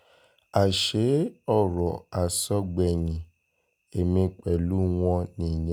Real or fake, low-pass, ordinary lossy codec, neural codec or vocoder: real; none; none; none